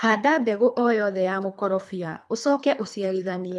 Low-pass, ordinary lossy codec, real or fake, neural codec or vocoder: none; none; fake; codec, 24 kHz, 3 kbps, HILCodec